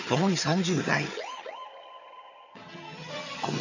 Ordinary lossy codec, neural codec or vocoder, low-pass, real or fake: none; vocoder, 22.05 kHz, 80 mel bands, HiFi-GAN; 7.2 kHz; fake